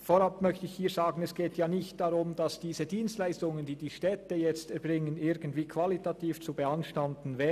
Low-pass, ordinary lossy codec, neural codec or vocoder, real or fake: 14.4 kHz; none; none; real